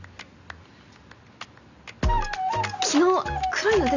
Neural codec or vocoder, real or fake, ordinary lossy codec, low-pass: none; real; none; 7.2 kHz